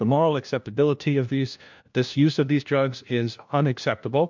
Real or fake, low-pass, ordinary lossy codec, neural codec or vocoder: fake; 7.2 kHz; MP3, 64 kbps; codec, 16 kHz, 1 kbps, FunCodec, trained on LibriTTS, 50 frames a second